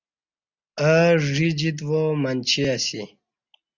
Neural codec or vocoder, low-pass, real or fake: none; 7.2 kHz; real